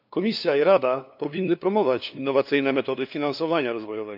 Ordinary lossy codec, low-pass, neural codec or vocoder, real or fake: none; 5.4 kHz; codec, 16 kHz, 2 kbps, FunCodec, trained on LibriTTS, 25 frames a second; fake